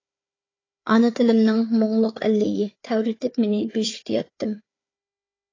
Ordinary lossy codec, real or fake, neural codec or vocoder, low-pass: AAC, 32 kbps; fake; codec, 16 kHz, 4 kbps, FunCodec, trained on Chinese and English, 50 frames a second; 7.2 kHz